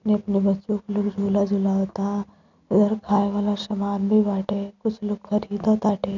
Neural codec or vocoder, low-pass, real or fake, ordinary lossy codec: none; 7.2 kHz; real; none